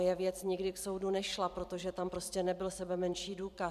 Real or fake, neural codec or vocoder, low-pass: fake; vocoder, 44.1 kHz, 128 mel bands every 256 samples, BigVGAN v2; 14.4 kHz